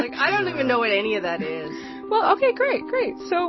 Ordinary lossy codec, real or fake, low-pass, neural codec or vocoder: MP3, 24 kbps; real; 7.2 kHz; none